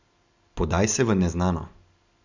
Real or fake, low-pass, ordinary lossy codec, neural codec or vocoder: real; 7.2 kHz; Opus, 64 kbps; none